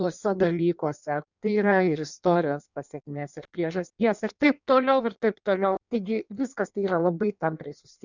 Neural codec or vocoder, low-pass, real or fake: codec, 16 kHz in and 24 kHz out, 1.1 kbps, FireRedTTS-2 codec; 7.2 kHz; fake